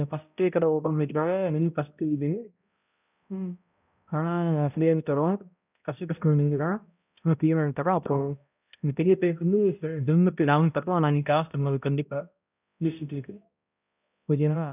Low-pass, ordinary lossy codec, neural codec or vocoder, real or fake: 3.6 kHz; none; codec, 16 kHz, 0.5 kbps, X-Codec, HuBERT features, trained on balanced general audio; fake